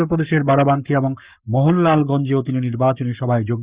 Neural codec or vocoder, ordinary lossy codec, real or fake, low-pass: codec, 44.1 kHz, 7.8 kbps, Pupu-Codec; Opus, 64 kbps; fake; 3.6 kHz